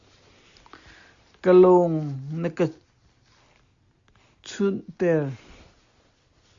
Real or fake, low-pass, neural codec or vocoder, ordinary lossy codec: real; 7.2 kHz; none; Opus, 64 kbps